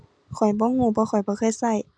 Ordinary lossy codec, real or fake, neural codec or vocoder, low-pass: none; real; none; none